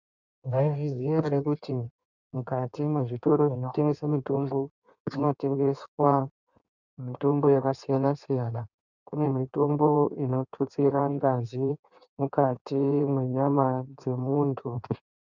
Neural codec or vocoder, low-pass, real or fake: codec, 16 kHz in and 24 kHz out, 1.1 kbps, FireRedTTS-2 codec; 7.2 kHz; fake